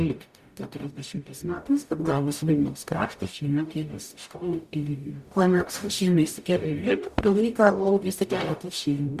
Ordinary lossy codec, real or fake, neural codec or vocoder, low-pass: Opus, 64 kbps; fake; codec, 44.1 kHz, 0.9 kbps, DAC; 14.4 kHz